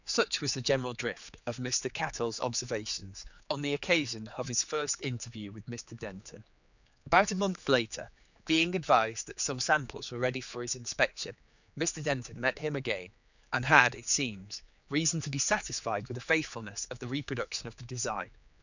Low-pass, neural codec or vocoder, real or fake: 7.2 kHz; codec, 16 kHz, 4 kbps, X-Codec, HuBERT features, trained on general audio; fake